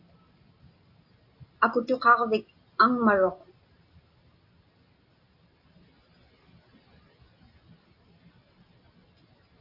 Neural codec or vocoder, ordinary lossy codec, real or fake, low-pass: none; AAC, 48 kbps; real; 5.4 kHz